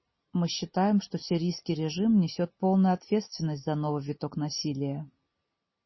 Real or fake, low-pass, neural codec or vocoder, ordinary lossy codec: real; 7.2 kHz; none; MP3, 24 kbps